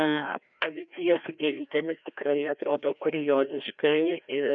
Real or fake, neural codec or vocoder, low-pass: fake; codec, 16 kHz, 1 kbps, FreqCodec, larger model; 7.2 kHz